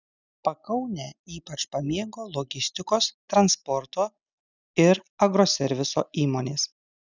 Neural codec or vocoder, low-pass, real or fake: none; 7.2 kHz; real